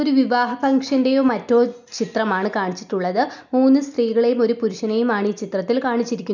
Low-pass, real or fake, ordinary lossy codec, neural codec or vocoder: 7.2 kHz; real; none; none